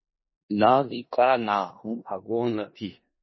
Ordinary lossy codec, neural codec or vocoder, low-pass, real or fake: MP3, 24 kbps; codec, 16 kHz in and 24 kHz out, 0.4 kbps, LongCat-Audio-Codec, four codebook decoder; 7.2 kHz; fake